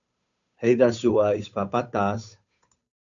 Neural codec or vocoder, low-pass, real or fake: codec, 16 kHz, 8 kbps, FunCodec, trained on Chinese and English, 25 frames a second; 7.2 kHz; fake